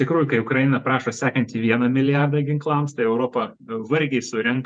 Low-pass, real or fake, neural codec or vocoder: 9.9 kHz; fake; vocoder, 48 kHz, 128 mel bands, Vocos